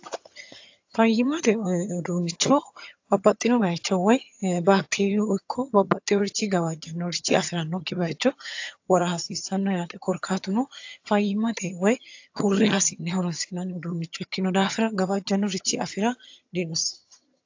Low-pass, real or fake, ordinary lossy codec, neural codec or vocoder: 7.2 kHz; fake; AAC, 48 kbps; vocoder, 22.05 kHz, 80 mel bands, HiFi-GAN